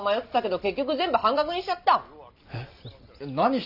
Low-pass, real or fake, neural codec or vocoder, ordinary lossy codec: 5.4 kHz; real; none; none